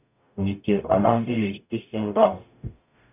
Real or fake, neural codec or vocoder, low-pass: fake; codec, 44.1 kHz, 0.9 kbps, DAC; 3.6 kHz